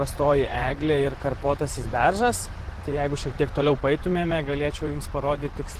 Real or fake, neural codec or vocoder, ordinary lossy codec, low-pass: fake; vocoder, 44.1 kHz, 128 mel bands, Pupu-Vocoder; Opus, 16 kbps; 14.4 kHz